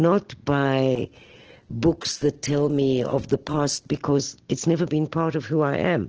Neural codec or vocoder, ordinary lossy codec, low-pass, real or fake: none; Opus, 16 kbps; 7.2 kHz; real